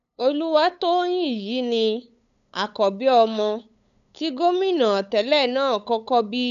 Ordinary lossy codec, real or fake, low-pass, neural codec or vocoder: none; fake; 7.2 kHz; codec, 16 kHz, 8 kbps, FunCodec, trained on LibriTTS, 25 frames a second